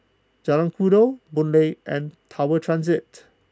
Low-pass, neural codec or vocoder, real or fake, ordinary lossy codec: none; none; real; none